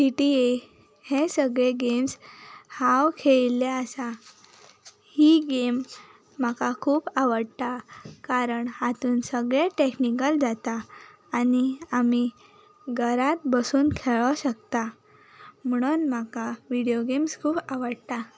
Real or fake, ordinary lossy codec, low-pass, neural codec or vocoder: real; none; none; none